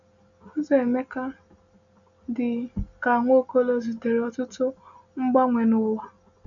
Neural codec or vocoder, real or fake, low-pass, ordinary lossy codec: none; real; 7.2 kHz; none